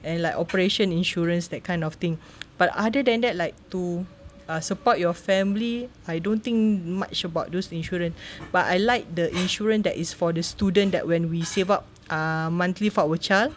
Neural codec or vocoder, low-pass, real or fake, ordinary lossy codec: none; none; real; none